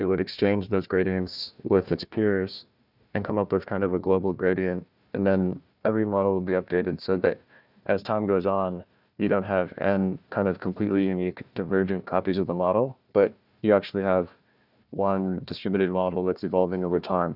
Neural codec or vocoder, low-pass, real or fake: codec, 16 kHz, 1 kbps, FunCodec, trained on Chinese and English, 50 frames a second; 5.4 kHz; fake